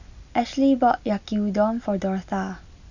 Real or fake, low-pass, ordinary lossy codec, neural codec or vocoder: real; 7.2 kHz; none; none